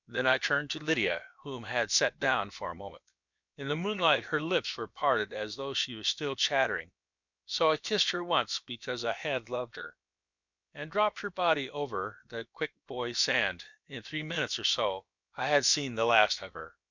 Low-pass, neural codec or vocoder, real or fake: 7.2 kHz; codec, 16 kHz, about 1 kbps, DyCAST, with the encoder's durations; fake